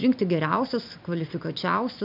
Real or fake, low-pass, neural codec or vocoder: real; 5.4 kHz; none